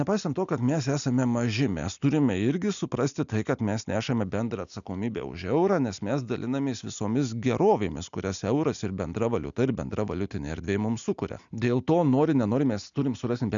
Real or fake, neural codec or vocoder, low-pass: real; none; 7.2 kHz